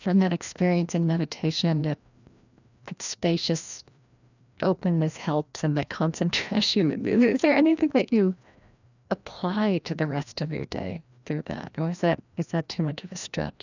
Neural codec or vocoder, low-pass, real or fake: codec, 16 kHz, 1 kbps, FreqCodec, larger model; 7.2 kHz; fake